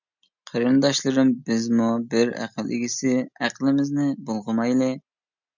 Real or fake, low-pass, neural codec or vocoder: real; 7.2 kHz; none